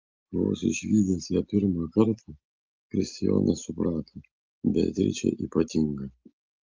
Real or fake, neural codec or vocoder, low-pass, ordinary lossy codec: real; none; 7.2 kHz; Opus, 32 kbps